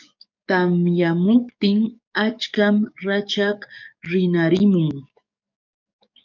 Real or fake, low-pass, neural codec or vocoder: fake; 7.2 kHz; codec, 44.1 kHz, 7.8 kbps, DAC